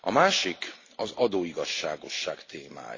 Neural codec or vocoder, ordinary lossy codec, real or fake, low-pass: none; AAC, 32 kbps; real; 7.2 kHz